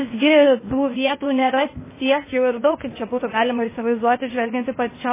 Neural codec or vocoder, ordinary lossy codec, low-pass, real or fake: codec, 16 kHz, 0.8 kbps, ZipCodec; MP3, 16 kbps; 3.6 kHz; fake